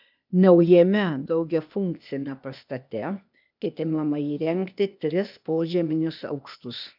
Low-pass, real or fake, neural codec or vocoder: 5.4 kHz; fake; codec, 16 kHz, 0.8 kbps, ZipCodec